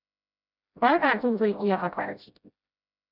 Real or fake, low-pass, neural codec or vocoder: fake; 5.4 kHz; codec, 16 kHz, 0.5 kbps, FreqCodec, smaller model